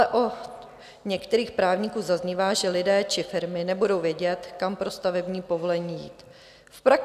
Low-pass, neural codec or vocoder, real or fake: 14.4 kHz; none; real